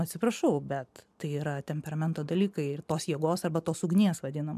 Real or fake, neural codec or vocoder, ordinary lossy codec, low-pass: real; none; MP3, 96 kbps; 14.4 kHz